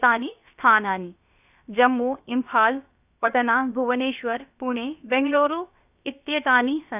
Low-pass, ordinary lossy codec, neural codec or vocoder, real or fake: 3.6 kHz; none; codec, 16 kHz, about 1 kbps, DyCAST, with the encoder's durations; fake